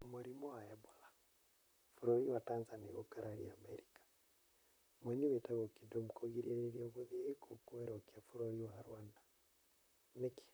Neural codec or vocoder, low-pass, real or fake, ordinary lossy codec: vocoder, 44.1 kHz, 128 mel bands, Pupu-Vocoder; none; fake; none